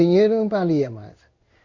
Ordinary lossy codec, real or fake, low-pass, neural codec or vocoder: Opus, 64 kbps; fake; 7.2 kHz; codec, 16 kHz in and 24 kHz out, 1 kbps, XY-Tokenizer